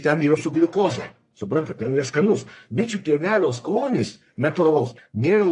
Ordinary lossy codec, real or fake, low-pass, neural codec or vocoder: AAC, 64 kbps; fake; 10.8 kHz; codec, 44.1 kHz, 1.7 kbps, Pupu-Codec